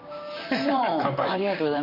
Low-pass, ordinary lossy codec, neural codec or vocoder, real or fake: 5.4 kHz; MP3, 32 kbps; vocoder, 44.1 kHz, 128 mel bands, Pupu-Vocoder; fake